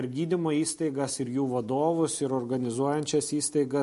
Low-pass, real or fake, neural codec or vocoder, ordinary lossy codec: 14.4 kHz; real; none; MP3, 48 kbps